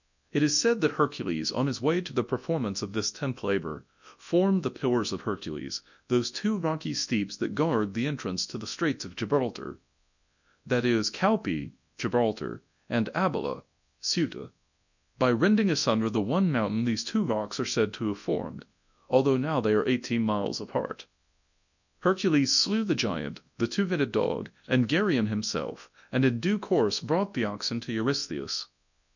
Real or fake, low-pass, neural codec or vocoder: fake; 7.2 kHz; codec, 24 kHz, 0.9 kbps, WavTokenizer, large speech release